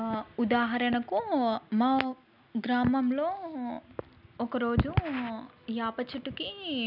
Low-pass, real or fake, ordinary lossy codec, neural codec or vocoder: 5.4 kHz; real; none; none